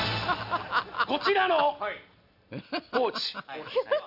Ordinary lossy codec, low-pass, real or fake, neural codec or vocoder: none; 5.4 kHz; real; none